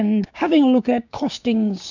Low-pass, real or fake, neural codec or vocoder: 7.2 kHz; fake; codec, 16 kHz, 6 kbps, DAC